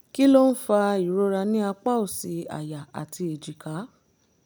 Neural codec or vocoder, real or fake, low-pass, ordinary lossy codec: none; real; none; none